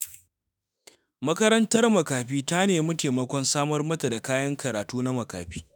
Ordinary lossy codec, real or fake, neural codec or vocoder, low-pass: none; fake; autoencoder, 48 kHz, 32 numbers a frame, DAC-VAE, trained on Japanese speech; none